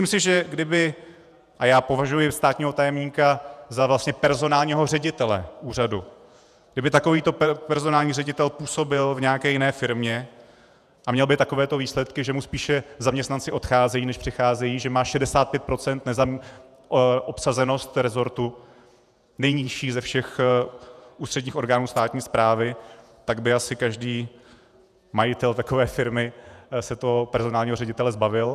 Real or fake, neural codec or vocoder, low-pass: fake; vocoder, 48 kHz, 128 mel bands, Vocos; 14.4 kHz